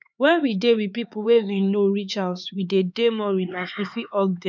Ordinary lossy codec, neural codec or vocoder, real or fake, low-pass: none; codec, 16 kHz, 4 kbps, X-Codec, HuBERT features, trained on LibriSpeech; fake; none